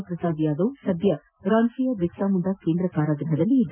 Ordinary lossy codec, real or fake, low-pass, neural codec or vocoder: none; real; 3.6 kHz; none